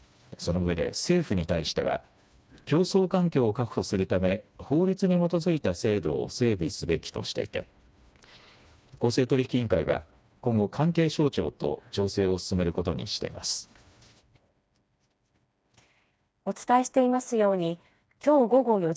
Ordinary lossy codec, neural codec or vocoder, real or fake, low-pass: none; codec, 16 kHz, 2 kbps, FreqCodec, smaller model; fake; none